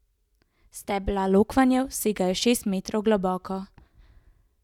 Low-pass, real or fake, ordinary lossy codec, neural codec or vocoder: 19.8 kHz; real; none; none